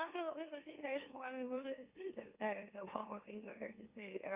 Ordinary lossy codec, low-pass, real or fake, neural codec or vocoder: Opus, 64 kbps; 3.6 kHz; fake; autoencoder, 44.1 kHz, a latent of 192 numbers a frame, MeloTTS